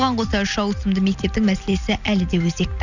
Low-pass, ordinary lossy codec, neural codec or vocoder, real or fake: 7.2 kHz; none; none; real